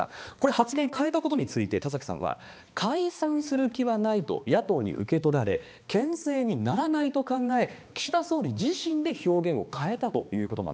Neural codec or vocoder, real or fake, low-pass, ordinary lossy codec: codec, 16 kHz, 2 kbps, X-Codec, HuBERT features, trained on balanced general audio; fake; none; none